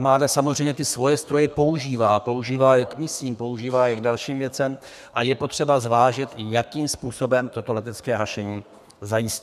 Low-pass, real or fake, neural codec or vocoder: 14.4 kHz; fake; codec, 32 kHz, 1.9 kbps, SNAC